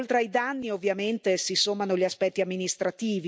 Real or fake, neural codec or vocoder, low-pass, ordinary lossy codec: real; none; none; none